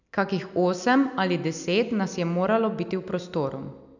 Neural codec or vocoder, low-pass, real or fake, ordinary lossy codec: none; 7.2 kHz; real; none